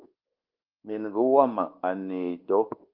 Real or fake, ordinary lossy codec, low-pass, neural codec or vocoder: fake; Opus, 32 kbps; 5.4 kHz; codec, 24 kHz, 1.2 kbps, DualCodec